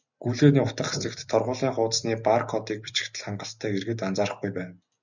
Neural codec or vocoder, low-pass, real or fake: none; 7.2 kHz; real